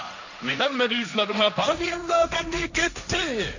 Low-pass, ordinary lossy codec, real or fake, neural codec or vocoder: 7.2 kHz; none; fake; codec, 16 kHz, 1.1 kbps, Voila-Tokenizer